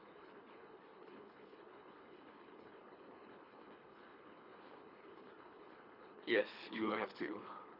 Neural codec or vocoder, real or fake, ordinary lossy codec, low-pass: codec, 24 kHz, 3 kbps, HILCodec; fake; none; 5.4 kHz